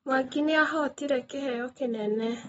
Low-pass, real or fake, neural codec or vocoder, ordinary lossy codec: 19.8 kHz; real; none; AAC, 24 kbps